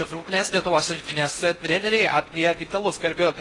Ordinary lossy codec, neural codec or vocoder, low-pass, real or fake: AAC, 32 kbps; codec, 16 kHz in and 24 kHz out, 0.6 kbps, FocalCodec, streaming, 4096 codes; 10.8 kHz; fake